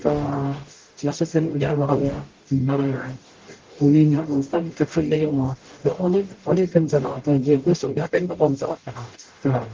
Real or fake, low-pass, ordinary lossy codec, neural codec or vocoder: fake; 7.2 kHz; Opus, 16 kbps; codec, 44.1 kHz, 0.9 kbps, DAC